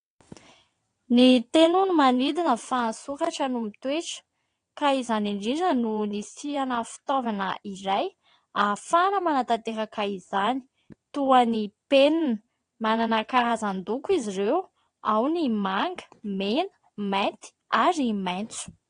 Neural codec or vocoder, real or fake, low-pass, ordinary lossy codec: vocoder, 22.05 kHz, 80 mel bands, WaveNeXt; fake; 9.9 kHz; AAC, 48 kbps